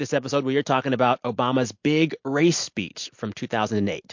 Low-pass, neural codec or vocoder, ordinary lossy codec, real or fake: 7.2 kHz; none; MP3, 48 kbps; real